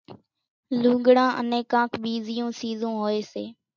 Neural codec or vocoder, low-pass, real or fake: none; 7.2 kHz; real